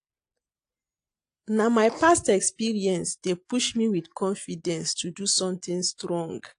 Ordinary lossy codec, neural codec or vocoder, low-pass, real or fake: AAC, 48 kbps; none; 9.9 kHz; real